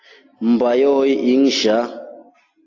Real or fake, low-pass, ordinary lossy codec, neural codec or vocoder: real; 7.2 kHz; AAC, 32 kbps; none